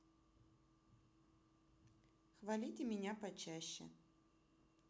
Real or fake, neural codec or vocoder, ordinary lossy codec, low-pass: real; none; none; none